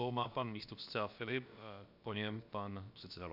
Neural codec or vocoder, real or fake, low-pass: codec, 16 kHz, about 1 kbps, DyCAST, with the encoder's durations; fake; 5.4 kHz